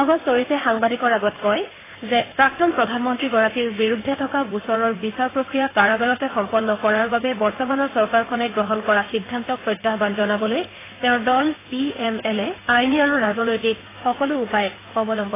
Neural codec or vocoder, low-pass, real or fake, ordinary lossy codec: codec, 16 kHz in and 24 kHz out, 2.2 kbps, FireRedTTS-2 codec; 3.6 kHz; fake; AAC, 16 kbps